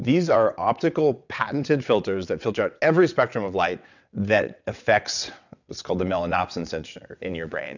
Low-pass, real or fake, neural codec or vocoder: 7.2 kHz; fake; vocoder, 22.05 kHz, 80 mel bands, WaveNeXt